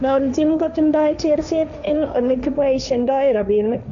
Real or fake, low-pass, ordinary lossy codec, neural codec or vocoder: fake; 7.2 kHz; none; codec, 16 kHz, 1.1 kbps, Voila-Tokenizer